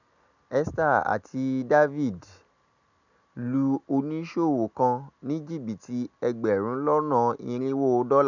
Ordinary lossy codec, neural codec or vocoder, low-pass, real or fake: none; none; 7.2 kHz; real